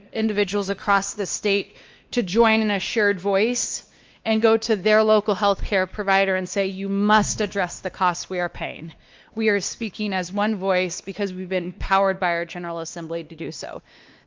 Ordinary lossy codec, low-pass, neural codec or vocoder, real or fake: Opus, 24 kbps; 7.2 kHz; codec, 16 kHz, 2 kbps, X-Codec, WavLM features, trained on Multilingual LibriSpeech; fake